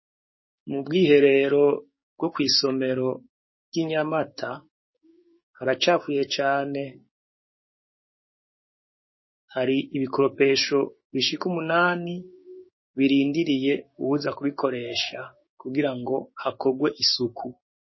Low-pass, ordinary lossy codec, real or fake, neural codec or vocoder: 7.2 kHz; MP3, 24 kbps; fake; codec, 16 kHz, 6 kbps, DAC